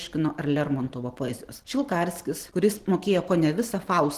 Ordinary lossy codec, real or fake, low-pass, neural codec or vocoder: Opus, 16 kbps; real; 14.4 kHz; none